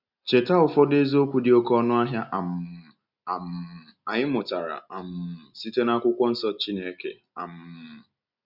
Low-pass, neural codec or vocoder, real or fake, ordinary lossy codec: 5.4 kHz; none; real; none